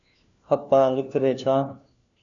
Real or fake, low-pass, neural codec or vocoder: fake; 7.2 kHz; codec, 16 kHz, 1 kbps, FunCodec, trained on LibriTTS, 50 frames a second